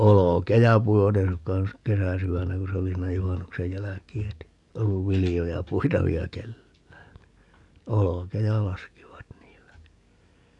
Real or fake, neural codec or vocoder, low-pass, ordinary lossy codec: fake; codec, 44.1 kHz, 7.8 kbps, DAC; 10.8 kHz; none